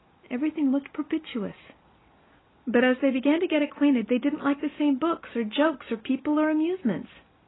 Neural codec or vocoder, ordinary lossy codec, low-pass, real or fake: none; AAC, 16 kbps; 7.2 kHz; real